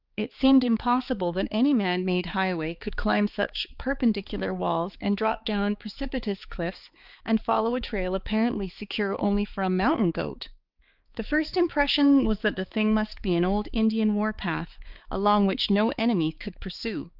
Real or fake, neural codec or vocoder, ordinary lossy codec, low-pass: fake; codec, 16 kHz, 4 kbps, X-Codec, HuBERT features, trained on balanced general audio; Opus, 24 kbps; 5.4 kHz